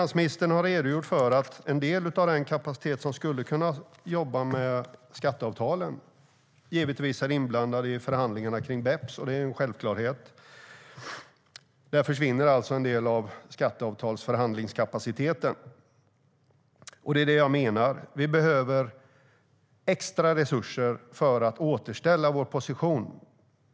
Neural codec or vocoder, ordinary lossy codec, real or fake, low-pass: none; none; real; none